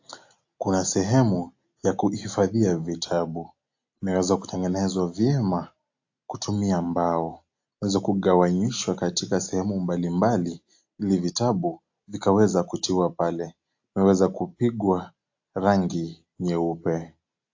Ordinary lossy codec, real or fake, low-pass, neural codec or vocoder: AAC, 48 kbps; real; 7.2 kHz; none